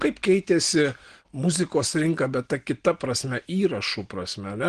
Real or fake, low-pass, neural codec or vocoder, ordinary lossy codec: real; 9.9 kHz; none; Opus, 16 kbps